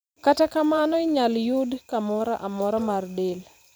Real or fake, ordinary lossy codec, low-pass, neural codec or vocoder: fake; none; none; vocoder, 44.1 kHz, 128 mel bands every 512 samples, BigVGAN v2